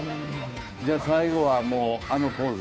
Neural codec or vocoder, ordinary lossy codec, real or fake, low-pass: codec, 16 kHz, 2 kbps, FunCodec, trained on Chinese and English, 25 frames a second; none; fake; none